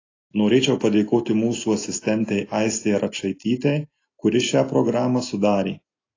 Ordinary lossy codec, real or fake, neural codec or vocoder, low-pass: AAC, 32 kbps; real; none; 7.2 kHz